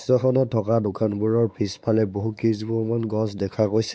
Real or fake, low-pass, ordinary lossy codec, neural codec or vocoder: fake; none; none; codec, 16 kHz, 4 kbps, X-Codec, WavLM features, trained on Multilingual LibriSpeech